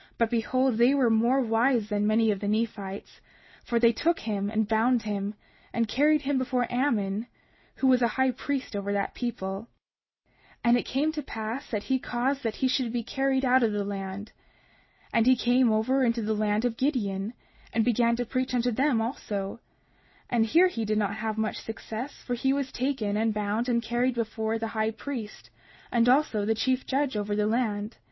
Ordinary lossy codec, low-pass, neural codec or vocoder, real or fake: MP3, 24 kbps; 7.2 kHz; none; real